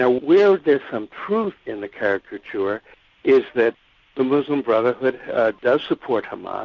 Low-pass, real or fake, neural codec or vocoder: 7.2 kHz; real; none